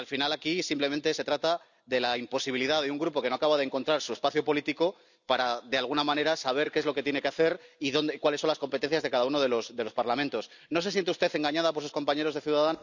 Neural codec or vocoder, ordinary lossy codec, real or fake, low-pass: none; none; real; 7.2 kHz